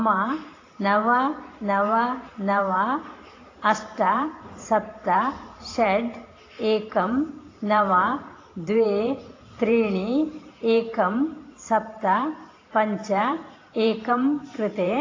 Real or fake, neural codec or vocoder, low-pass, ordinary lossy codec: fake; vocoder, 44.1 kHz, 128 mel bands every 512 samples, BigVGAN v2; 7.2 kHz; AAC, 32 kbps